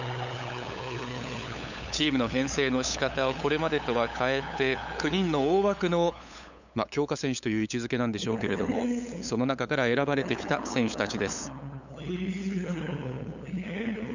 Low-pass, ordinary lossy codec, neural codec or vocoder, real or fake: 7.2 kHz; none; codec, 16 kHz, 8 kbps, FunCodec, trained on LibriTTS, 25 frames a second; fake